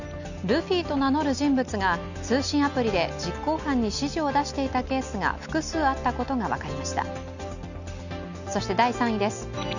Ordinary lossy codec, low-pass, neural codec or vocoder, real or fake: none; 7.2 kHz; none; real